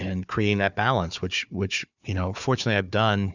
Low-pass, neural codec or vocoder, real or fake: 7.2 kHz; codec, 16 kHz, 4 kbps, FunCodec, trained on Chinese and English, 50 frames a second; fake